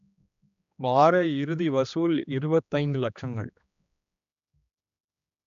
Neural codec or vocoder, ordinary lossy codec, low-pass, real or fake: codec, 16 kHz, 2 kbps, X-Codec, HuBERT features, trained on general audio; none; 7.2 kHz; fake